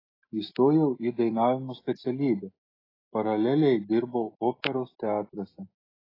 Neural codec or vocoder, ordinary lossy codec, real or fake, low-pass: none; AAC, 24 kbps; real; 5.4 kHz